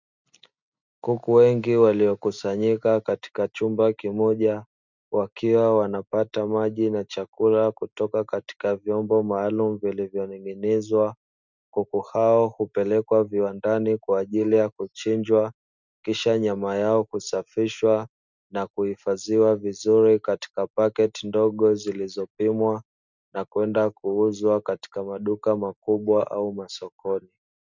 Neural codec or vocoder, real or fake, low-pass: none; real; 7.2 kHz